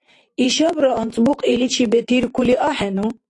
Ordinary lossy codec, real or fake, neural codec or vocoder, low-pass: AAC, 48 kbps; fake; vocoder, 44.1 kHz, 128 mel bands every 512 samples, BigVGAN v2; 10.8 kHz